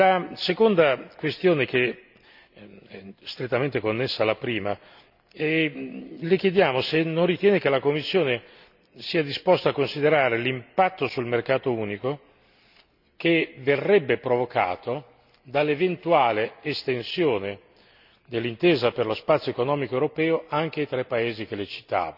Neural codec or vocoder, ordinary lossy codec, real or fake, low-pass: none; none; real; 5.4 kHz